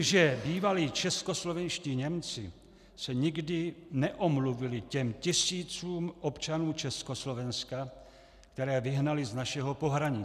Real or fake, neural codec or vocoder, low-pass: real; none; 14.4 kHz